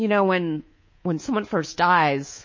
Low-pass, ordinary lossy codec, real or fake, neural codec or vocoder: 7.2 kHz; MP3, 32 kbps; fake; codec, 24 kHz, 3.1 kbps, DualCodec